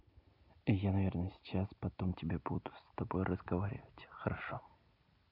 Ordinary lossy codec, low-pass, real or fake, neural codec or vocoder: none; 5.4 kHz; real; none